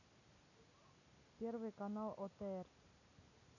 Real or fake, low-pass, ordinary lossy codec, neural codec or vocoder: real; 7.2 kHz; none; none